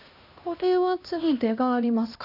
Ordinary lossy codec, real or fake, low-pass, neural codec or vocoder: none; fake; 5.4 kHz; codec, 16 kHz, 1 kbps, X-Codec, HuBERT features, trained on LibriSpeech